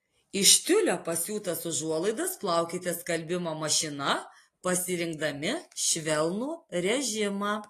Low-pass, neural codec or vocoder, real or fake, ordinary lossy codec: 14.4 kHz; none; real; AAC, 48 kbps